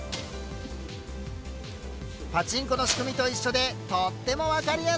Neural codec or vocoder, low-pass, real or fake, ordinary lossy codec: none; none; real; none